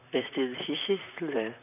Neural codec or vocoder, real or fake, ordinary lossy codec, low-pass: vocoder, 44.1 kHz, 128 mel bands, Pupu-Vocoder; fake; none; 3.6 kHz